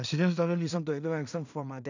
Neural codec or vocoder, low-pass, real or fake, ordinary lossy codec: codec, 16 kHz in and 24 kHz out, 0.4 kbps, LongCat-Audio-Codec, two codebook decoder; 7.2 kHz; fake; none